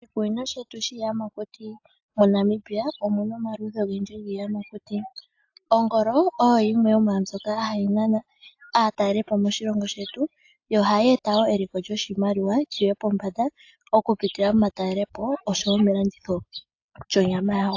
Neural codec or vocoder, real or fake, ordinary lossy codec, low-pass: none; real; AAC, 48 kbps; 7.2 kHz